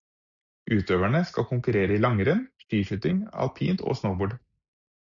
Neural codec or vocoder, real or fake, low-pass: none; real; 7.2 kHz